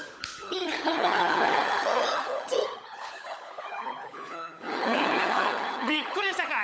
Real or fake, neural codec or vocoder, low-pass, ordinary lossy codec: fake; codec, 16 kHz, 16 kbps, FunCodec, trained on LibriTTS, 50 frames a second; none; none